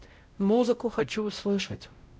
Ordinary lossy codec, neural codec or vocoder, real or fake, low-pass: none; codec, 16 kHz, 0.5 kbps, X-Codec, WavLM features, trained on Multilingual LibriSpeech; fake; none